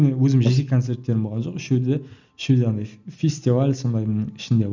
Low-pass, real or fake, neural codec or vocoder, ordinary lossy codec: 7.2 kHz; real; none; none